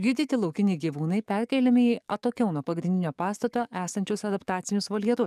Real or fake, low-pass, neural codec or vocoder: fake; 14.4 kHz; codec, 44.1 kHz, 7.8 kbps, DAC